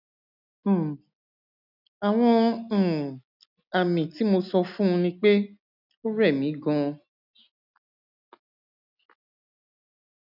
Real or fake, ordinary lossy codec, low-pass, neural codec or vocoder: real; none; 5.4 kHz; none